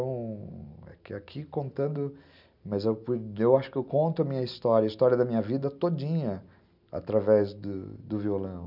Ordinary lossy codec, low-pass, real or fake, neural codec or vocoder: none; 5.4 kHz; real; none